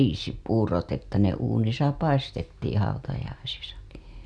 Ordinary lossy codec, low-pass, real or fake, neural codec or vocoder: none; none; real; none